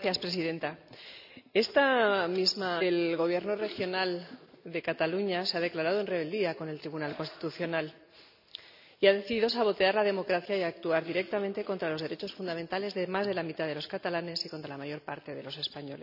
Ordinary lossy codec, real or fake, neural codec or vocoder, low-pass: none; real; none; 5.4 kHz